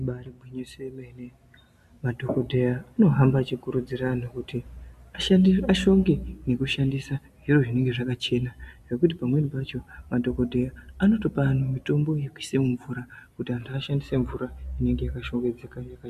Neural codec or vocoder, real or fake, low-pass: none; real; 14.4 kHz